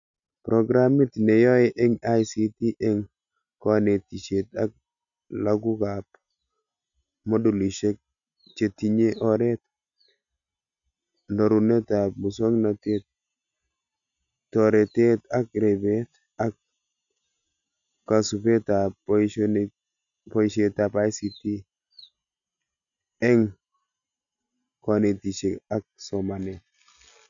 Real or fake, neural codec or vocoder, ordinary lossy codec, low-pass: real; none; none; 7.2 kHz